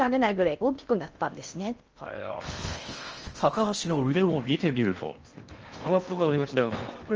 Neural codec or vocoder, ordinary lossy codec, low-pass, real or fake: codec, 16 kHz in and 24 kHz out, 0.6 kbps, FocalCodec, streaming, 4096 codes; Opus, 24 kbps; 7.2 kHz; fake